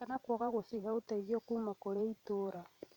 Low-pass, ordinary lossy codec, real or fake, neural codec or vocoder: 19.8 kHz; none; fake; vocoder, 44.1 kHz, 128 mel bands every 512 samples, BigVGAN v2